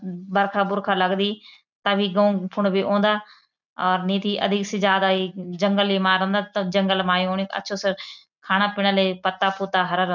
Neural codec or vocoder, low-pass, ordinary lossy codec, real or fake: none; 7.2 kHz; none; real